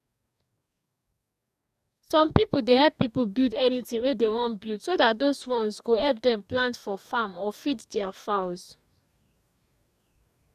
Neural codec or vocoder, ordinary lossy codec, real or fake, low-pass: codec, 44.1 kHz, 2.6 kbps, DAC; none; fake; 14.4 kHz